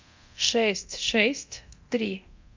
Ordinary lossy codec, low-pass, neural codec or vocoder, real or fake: MP3, 64 kbps; 7.2 kHz; codec, 24 kHz, 0.9 kbps, DualCodec; fake